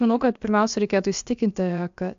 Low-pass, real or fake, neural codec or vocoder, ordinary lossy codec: 7.2 kHz; fake; codec, 16 kHz, about 1 kbps, DyCAST, with the encoder's durations; AAC, 64 kbps